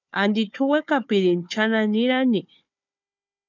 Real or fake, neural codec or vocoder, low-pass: fake; codec, 16 kHz, 4 kbps, FunCodec, trained on Chinese and English, 50 frames a second; 7.2 kHz